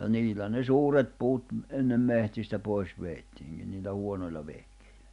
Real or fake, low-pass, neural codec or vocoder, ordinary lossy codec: real; 10.8 kHz; none; none